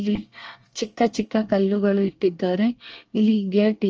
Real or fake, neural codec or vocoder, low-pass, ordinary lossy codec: fake; codec, 24 kHz, 1 kbps, SNAC; 7.2 kHz; Opus, 24 kbps